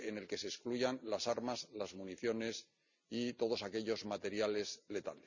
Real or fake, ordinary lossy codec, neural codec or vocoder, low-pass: real; none; none; 7.2 kHz